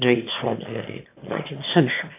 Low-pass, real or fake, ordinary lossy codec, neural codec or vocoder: 3.6 kHz; fake; AAC, 24 kbps; autoencoder, 22.05 kHz, a latent of 192 numbers a frame, VITS, trained on one speaker